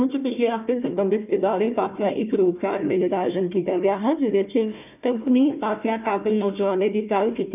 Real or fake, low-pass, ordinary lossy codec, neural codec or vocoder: fake; 3.6 kHz; none; codec, 16 kHz, 1 kbps, FunCodec, trained on Chinese and English, 50 frames a second